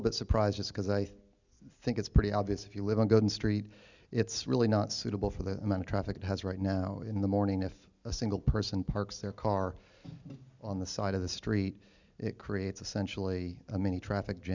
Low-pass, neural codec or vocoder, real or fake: 7.2 kHz; none; real